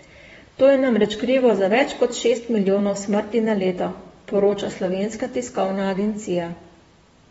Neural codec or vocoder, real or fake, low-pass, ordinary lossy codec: vocoder, 44.1 kHz, 128 mel bands, Pupu-Vocoder; fake; 19.8 kHz; AAC, 24 kbps